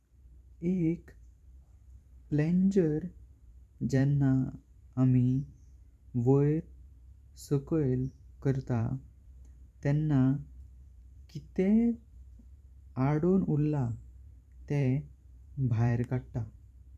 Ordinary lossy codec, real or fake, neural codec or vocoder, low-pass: none; fake; vocoder, 44.1 kHz, 128 mel bands every 512 samples, BigVGAN v2; 14.4 kHz